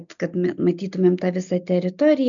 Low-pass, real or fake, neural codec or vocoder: 7.2 kHz; real; none